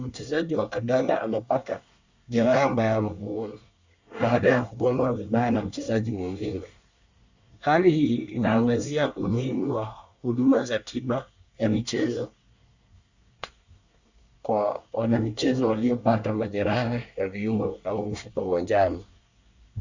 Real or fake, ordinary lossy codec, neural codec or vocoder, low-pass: fake; Opus, 64 kbps; codec, 24 kHz, 1 kbps, SNAC; 7.2 kHz